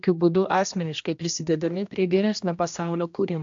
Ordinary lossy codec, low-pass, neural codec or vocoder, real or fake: AAC, 64 kbps; 7.2 kHz; codec, 16 kHz, 1 kbps, X-Codec, HuBERT features, trained on general audio; fake